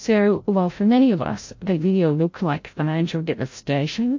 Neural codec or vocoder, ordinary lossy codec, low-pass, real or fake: codec, 16 kHz, 0.5 kbps, FreqCodec, larger model; MP3, 48 kbps; 7.2 kHz; fake